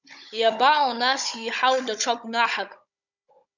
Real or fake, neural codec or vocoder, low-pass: fake; codec, 16 kHz, 16 kbps, FunCodec, trained on Chinese and English, 50 frames a second; 7.2 kHz